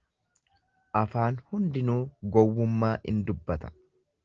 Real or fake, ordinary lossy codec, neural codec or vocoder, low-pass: real; Opus, 16 kbps; none; 7.2 kHz